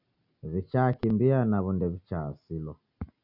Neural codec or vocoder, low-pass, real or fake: none; 5.4 kHz; real